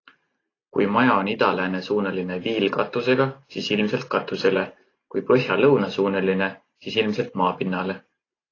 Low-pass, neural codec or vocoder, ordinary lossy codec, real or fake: 7.2 kHz; none; AAC, 32 kbps; real